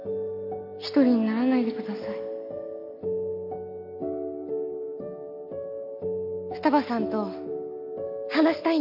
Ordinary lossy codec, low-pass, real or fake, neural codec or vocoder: none; 5.4 kHz; real; none